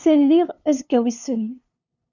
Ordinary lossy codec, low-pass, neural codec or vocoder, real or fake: Opus, 64 kbps; 7.2 kHz; codec, 16 kHz, 2 kbps, FunCodec, trained on LibriTTS, 25 frames a second; fake